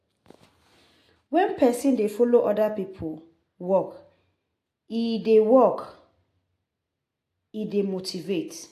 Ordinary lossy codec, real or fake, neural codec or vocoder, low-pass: AAC, 64 kbps; real; none; 14.4 kHz